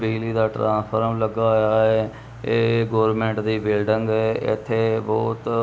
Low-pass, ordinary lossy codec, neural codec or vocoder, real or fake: none; none; none; real